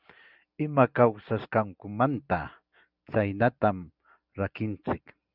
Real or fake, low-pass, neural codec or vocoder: real; 5.4 kHz; none